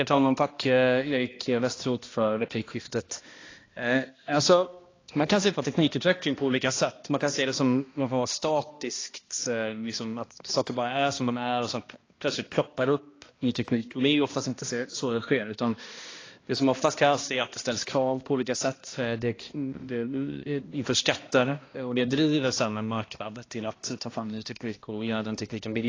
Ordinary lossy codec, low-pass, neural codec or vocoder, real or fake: AAC, 32 kbps; 7.2 kHz; codec, 16 kHz, 1 kbps, X-Codec, HuBERT features, trained on balanced general audio; fake